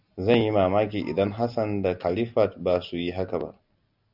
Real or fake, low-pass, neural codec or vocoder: real; 5.4 kHz; none